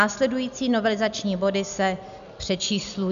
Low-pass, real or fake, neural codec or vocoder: 7.2 kHz; real; none